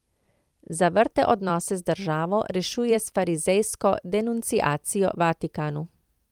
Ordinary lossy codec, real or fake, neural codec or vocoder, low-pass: Opus, 32 kbps; fake; vocoder, 44.1 kHz, 128 mel bands every 256 samples, BigVGAN v2; 19.8 kHz